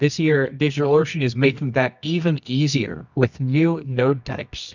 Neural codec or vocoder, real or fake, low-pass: codec, 24 kHz, 0.9 kbps, WavTokenizer, medium music audio release; fake; 7.2 kHz